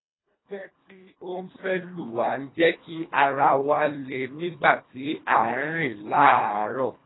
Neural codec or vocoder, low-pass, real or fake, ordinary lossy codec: codec, 24 kHz, 1.5 kbps, HILCodec; 7.2 kHz; fake; AAC, 16 kbps